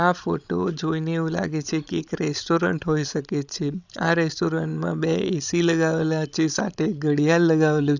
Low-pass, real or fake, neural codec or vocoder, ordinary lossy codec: 7.2 kHz; fake; codec, 16 kHz, 16 kbps, FreqCodec, larger model; none